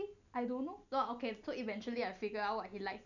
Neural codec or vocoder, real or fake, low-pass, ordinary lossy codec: none; real; 7.2 kHz; none